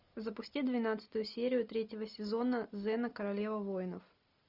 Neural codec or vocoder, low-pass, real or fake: none; 5.4 kHz; real